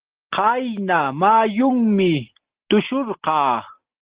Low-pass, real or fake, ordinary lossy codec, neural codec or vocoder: 3.6 kHz; real; Opus, 16 kbps; none